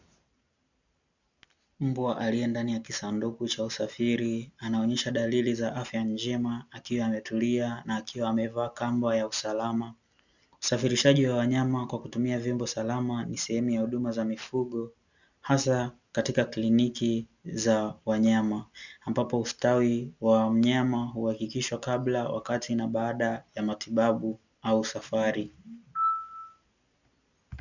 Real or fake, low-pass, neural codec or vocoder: real; 7.2 kHz; none